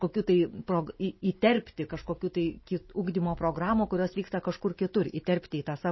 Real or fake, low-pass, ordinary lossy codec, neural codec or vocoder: real; 7.2 kHz; MP3, 24 kbps; none